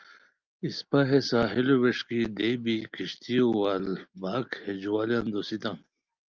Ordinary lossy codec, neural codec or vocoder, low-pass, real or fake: Opus, 24 kbps; none; 7.2 kHz; real